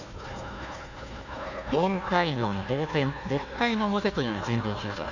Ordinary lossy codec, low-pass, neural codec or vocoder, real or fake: none; 7.2 kHz; codec, 16 kHz, 1 kbps, FunCodec, trained on Chinese and English, 50 frames a second; fake